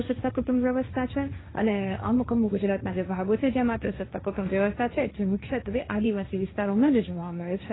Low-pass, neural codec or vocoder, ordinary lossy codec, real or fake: 7.2 kHz; codec, 16 kHz, 1.1 kbps, Voila-Tokenizer; AAC, 16 kbps; fake